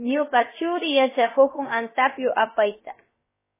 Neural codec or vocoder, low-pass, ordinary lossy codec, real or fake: codec, 16 kHz, about 1 kbps, DyCAST, with the encoder's durations; 3.6 kHz; MP3, 16 kbps; fake